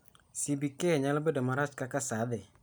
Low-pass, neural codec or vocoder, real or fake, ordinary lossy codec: none; none; real; none